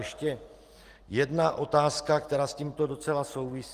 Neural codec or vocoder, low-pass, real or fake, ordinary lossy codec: none; 14.4 kHz; real; Opus, 24 kbps